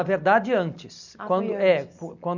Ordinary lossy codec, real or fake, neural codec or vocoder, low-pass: none; real; none; 7.2 kHz